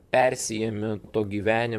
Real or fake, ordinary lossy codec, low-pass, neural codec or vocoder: fake; MP3, 96 kbps; 14.4 kHz; vocoder, 44.1 kHz, 128 mel bands, Pupu-Vocoder